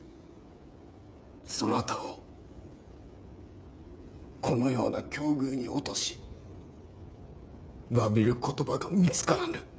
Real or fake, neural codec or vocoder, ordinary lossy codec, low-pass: fake; codec, 16 kHz, 8 kbps, FreqCodec, smaller model; none; none